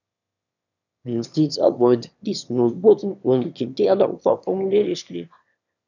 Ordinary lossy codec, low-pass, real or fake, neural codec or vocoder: none; 7.2 kHz; fake; autoencoder, 22.05 kHz, a latent of 192 numbers a frame, VITS, trained on one speaker